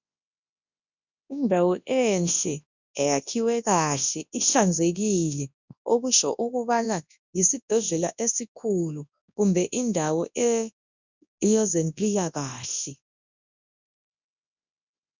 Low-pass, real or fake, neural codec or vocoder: 7.2 kHz; fake; codec, 24 kHz, 0.9 kbps, WavTokenizer, large speech release